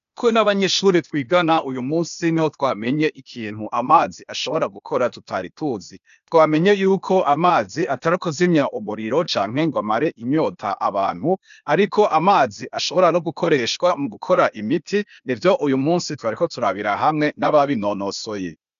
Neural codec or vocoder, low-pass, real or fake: codec, 16 kHz, 0.8 kbps, ZipCodec; 7.2 kHz; fake